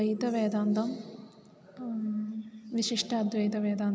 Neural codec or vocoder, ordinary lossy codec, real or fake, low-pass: none; none; real; none